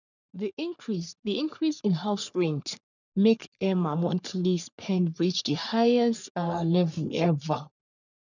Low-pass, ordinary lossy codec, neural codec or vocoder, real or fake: 7.2 kHz; none; codec, 44.1 kHz, 3.4 kbps, Pupu-Codec; fake